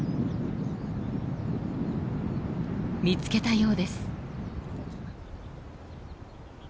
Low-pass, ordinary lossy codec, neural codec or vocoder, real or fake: none; none; none; real